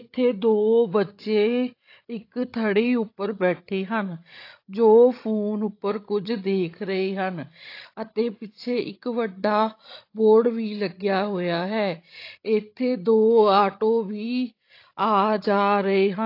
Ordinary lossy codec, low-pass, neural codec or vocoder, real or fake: AAC, 32 kbps; 5.4 kHz; codec, 16 kHz, 16 kbps, FreqCodec, larger model; fake